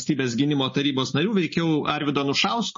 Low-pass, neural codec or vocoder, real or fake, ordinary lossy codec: 7.2 kHz; none; real; MP3, 32 kbps